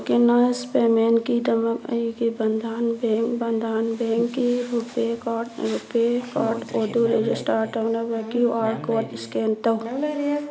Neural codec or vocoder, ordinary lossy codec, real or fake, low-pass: none; none; real; none